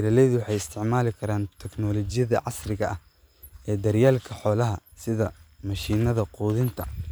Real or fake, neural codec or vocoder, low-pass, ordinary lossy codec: real; none; none; none